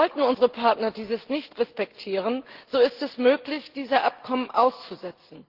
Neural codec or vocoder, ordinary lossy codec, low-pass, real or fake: none; Opus, 16 kbps; 5.4 kHz; real